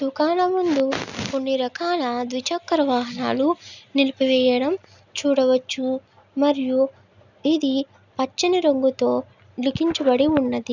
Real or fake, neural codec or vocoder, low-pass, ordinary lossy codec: real; none; 7.2 kHz; none